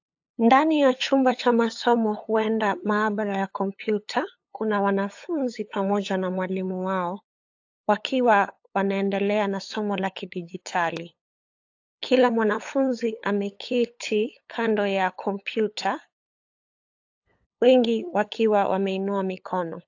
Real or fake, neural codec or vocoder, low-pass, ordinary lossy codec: fake; codec, 16 kHz, 8 kbps, FunCodec, trained on LibriTTS, 25 frames a second; 7.2 kHz; AAC, 48 kbps